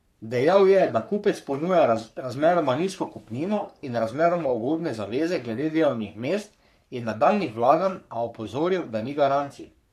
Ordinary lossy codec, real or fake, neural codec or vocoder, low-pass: none; fake; codec, 44.1 kHz, 3.4 kbps, Pupu-Codec; 14.4 kHz